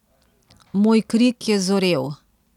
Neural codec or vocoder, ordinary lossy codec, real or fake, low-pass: none; none; real; 19.8 kHz